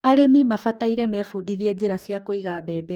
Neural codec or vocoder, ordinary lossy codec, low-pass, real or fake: codec, 44.1 kHz, 2.6 kbps, DAC; none; 19.8 kHz; fake